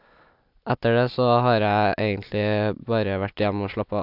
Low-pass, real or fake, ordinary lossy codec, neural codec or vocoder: 5.4 kHz; real; AAC, 48 kbps; none